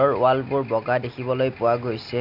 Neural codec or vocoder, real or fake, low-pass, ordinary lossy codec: none; real; 5.4 kHz; none